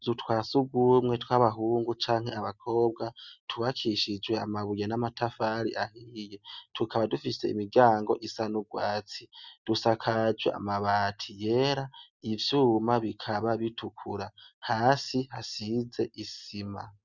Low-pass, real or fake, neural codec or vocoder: 7.2 kHz; real; none